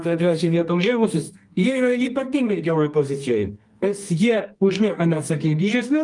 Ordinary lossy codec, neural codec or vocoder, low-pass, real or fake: Opus, 32 kbps; codec, 24 kHz, 0.9 kbps, WavTokenizer, medium music audio release; 10.8 kHz; fake